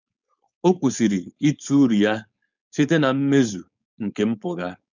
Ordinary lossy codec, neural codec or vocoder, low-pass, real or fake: none; codec, 16 kHz, 4.8 kbps, FACodec; 7.2 kHz; fake